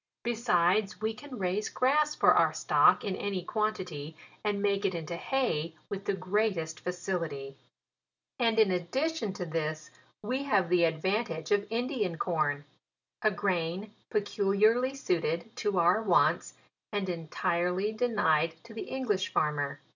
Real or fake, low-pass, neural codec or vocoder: real; 7.2 kHz; none